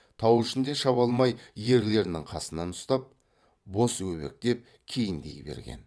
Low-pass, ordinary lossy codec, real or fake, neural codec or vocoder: none; none; fake; vocoder, 22.05 kHz, 80 mel bands, WaveNeXt